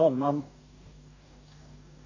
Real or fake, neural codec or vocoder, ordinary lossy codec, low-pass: fake; codec, 44.1 kHz, 2.6 kbps, DAC; none; 7.2 kHz